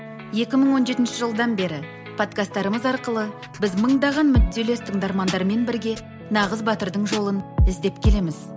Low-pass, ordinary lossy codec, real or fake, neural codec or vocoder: none; none; real; none